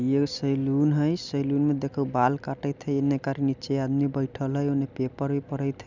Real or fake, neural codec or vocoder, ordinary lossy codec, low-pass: real; none; none; 7.2 kHz